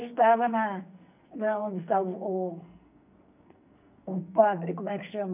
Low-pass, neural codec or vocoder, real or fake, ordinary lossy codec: 3.6 kHz; codec, 44.1 kHz, 2.6 kbps, SNAC; fake; none